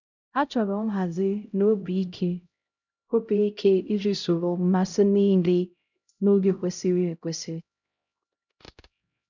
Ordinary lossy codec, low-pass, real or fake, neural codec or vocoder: none; 7.2 kHz; fake; codec, 16 kHz, 0.5 kbps, X-Codec, HuBERT features, trained on LibriSpeech